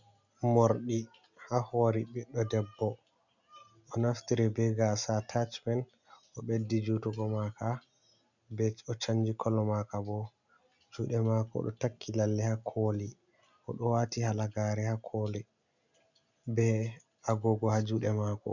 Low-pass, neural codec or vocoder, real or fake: 7.2 kHz; none; real